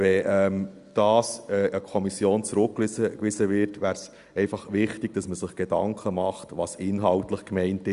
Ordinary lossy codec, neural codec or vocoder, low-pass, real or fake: Opus, 64 kbps; none; 10.8 kHz; real